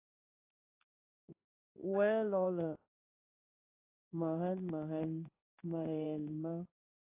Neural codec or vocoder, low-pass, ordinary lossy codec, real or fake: codec, 16 kHz in and 24 kHz out, 1 kbps, XY-Tokenizer; 3.6 kHz; AAC, 24 kbps; fake